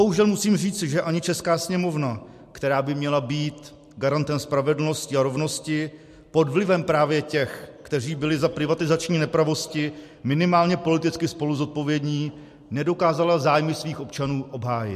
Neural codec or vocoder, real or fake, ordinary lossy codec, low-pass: none; real; MP3, 64 kbps; 14.4 kHz